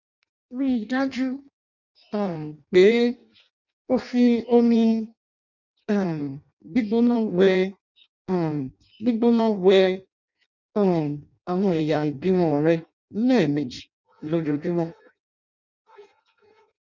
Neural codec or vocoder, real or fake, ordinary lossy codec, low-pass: codec, 16 kHz in and 24 kHz out, 0.6 kbps, FireRedTTS-2 codec; fake; none; 7.2 kHz